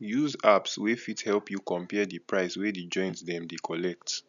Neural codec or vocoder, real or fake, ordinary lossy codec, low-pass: none; real; none; 7.2 kHz